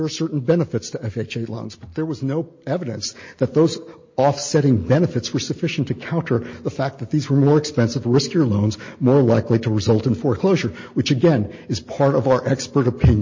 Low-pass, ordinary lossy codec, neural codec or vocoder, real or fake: 7.2 kHz; MP3, 32 kbps; none; real